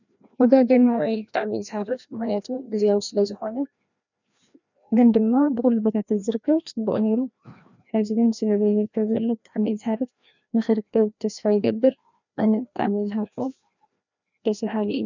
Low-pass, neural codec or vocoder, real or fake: 7.2 kHz; codec, 16 kHz, 1 kbps, FreqCodec, larger model; fake